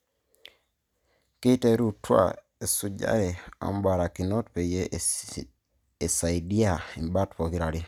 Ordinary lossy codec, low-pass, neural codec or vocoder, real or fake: none; 19.8 kHz; none; real